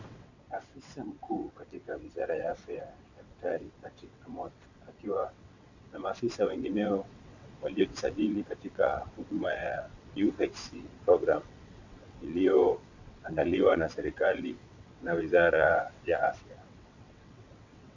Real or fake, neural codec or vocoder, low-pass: fake; vocoder, 22.05 kHz, 80 mel bands, WaveNeXt; 7.2 kHz